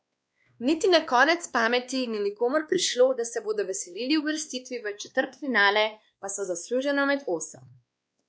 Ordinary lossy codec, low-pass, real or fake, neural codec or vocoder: none; none; fake; codec, 16 kHz, 2 kbps, X-Codec, WavLM features, trained on Multilingual LibriSpeech